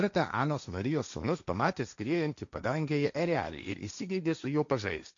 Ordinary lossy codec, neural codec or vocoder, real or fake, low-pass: MP3, 64 kbps; codec, 16 kHz, 1.1 kbps, Voila-Tokenizer; fake; 7.2 kHz